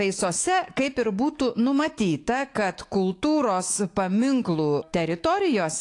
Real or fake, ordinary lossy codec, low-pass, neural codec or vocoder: fake; AAC, 48 kbps; 10.8 kHz; autoencoder, 48 kHz, 128 numbers a frame, DAC-VAE, trained on Japanese speech